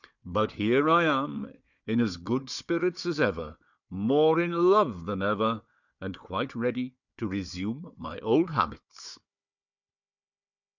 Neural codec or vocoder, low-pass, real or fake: codec, 16 kHz, 4 kbps, FunCodec, trained on Chinese and English, 50 frames a second; 7.2 kHz; fake